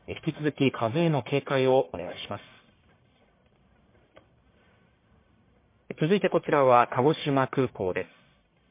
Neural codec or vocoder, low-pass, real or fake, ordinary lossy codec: codec, 44.1 kHz, 1.7 kbps, Pupu-Codec; 3.6 kHz; fake; MP3, 24 kbps